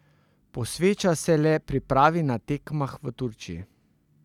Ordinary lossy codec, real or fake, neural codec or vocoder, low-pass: none; real; none; 19.8 kHz